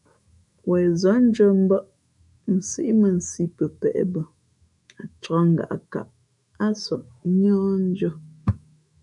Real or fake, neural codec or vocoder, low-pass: fake; autoencoder, 48 kHz, 128 numbers a frame, DAC-VAE, trained on Japanese speech; 10.8 kHz